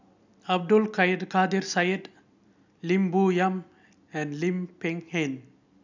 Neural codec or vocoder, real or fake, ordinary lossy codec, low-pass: none; real; none; 7.2 kHz